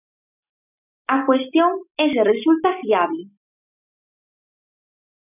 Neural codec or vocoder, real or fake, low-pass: none; real; 3.6 kHz